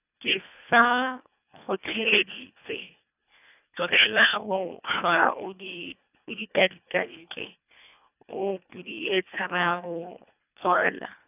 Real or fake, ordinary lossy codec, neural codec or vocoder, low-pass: fake; none; codec, 24 kHz, 1.5 kbps, HILCodec; 3.6 kHz